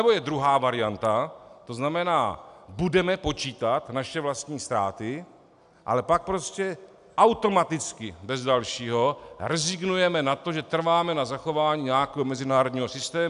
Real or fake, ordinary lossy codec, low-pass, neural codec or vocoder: real; AAC, 96 kbps; 10.8 kHz; none